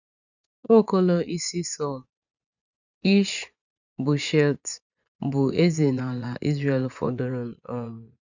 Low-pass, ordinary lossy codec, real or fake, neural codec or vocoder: 7.2 kHz; none; fake; vocoder, 24 kHz, 100 mel bands, Vocos